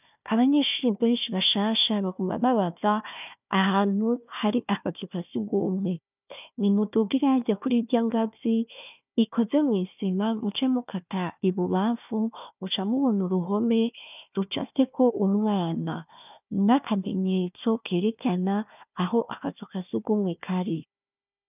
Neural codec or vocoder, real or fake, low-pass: codec, 16 kHz, 1 kbps, FunCodec, trained on Chinese and English, 50 frames a second; fake; 3.6 kHz